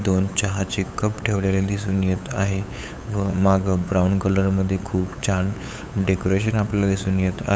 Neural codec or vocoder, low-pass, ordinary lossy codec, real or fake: codec, 16 kHz, 8 kbps, FunCodec, trained on LibriTTS, 25 frames a second; none; none; fake